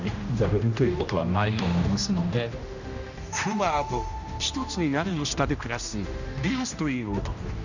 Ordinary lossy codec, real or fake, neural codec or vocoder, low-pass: none; fake; codec, 16 kHz, 1 kbps, X-Codec, HuBERT features, trained on general audio; 7.2 kHz